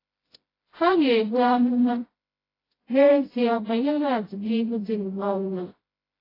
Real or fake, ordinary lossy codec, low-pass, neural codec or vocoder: fake; AAC, 24 kbps; 5.4 kHz; codec, 16 kHz, 0.5 kbps, FreqCodec, smaller model